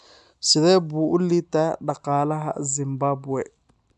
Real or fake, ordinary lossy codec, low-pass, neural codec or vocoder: real; none; 10.8 kHz; none